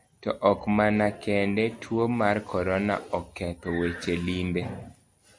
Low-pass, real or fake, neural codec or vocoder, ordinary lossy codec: 9.9 kHz; real; none; MP3, 64 kbps